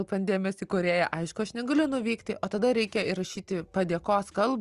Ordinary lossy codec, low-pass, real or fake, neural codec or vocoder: Opus, 32 kbps; 10.8 kHz; real; none